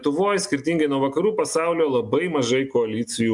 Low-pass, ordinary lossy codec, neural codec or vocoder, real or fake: 10.8 kHz; MP3, 96 kbps; none; real